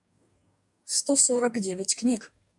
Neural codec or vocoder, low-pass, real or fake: codec, 44.1 kHz, 2.6 kbps, DAC; 10.8 kHz; fake